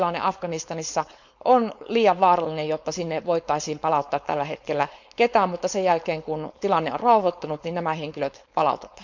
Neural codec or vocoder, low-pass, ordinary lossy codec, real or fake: codec, 16 kHz, 4.8 kbps, FACodec; 7.2 kHz; none; fake